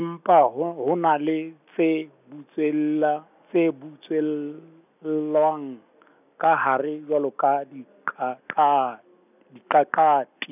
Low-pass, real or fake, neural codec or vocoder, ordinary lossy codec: 3.6 kHz; real; none; none